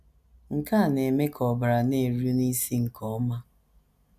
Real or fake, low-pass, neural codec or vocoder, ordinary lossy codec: real; 14.4 kHz; none; none